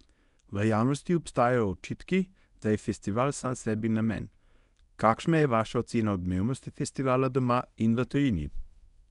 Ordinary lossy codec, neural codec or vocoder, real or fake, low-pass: none; codec, 24 kHz, 0.9 kbps, WavTokenizer, medium speech release version 1; fake; 10.8 kHz